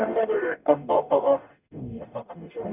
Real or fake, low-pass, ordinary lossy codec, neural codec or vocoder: fake; 3.6 kHz; none; codec, 44.1 kHz, 0.9 kbps, DAC